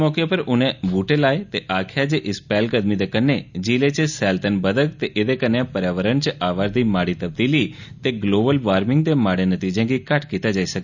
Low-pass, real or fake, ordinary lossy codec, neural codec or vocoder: 7.2 kHz; real; none; none